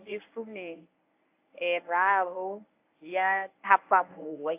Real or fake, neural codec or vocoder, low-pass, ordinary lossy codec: fake; codec, 24 kHz, 0.9 kbps, WavTokenizer, medium speech release version 1; 3.6 kHz; AAC, 32 kbps